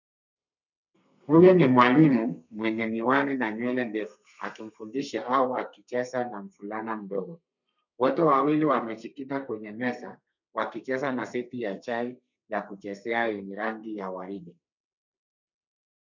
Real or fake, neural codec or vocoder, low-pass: fake; codec, 32 kHz, 1.9 kbps, SNAC; 7.2 kHz